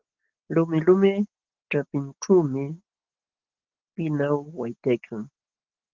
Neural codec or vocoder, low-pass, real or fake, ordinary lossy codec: none; 7.2 kHz; real; Opus, 16 kbps